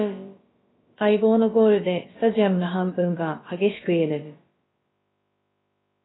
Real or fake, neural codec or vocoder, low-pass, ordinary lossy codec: fake; codec, 16 kHz, about 1 kbps, DyCAST, with the encoder's durations; 7.2 kHz; AAC, 16 kbps